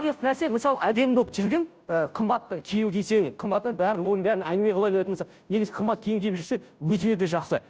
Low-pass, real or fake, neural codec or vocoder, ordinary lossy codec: none; fake; codec, 16 kHz, 0.5 kbps, FunCodec, trained on Chinese and English, 25 frames a second; none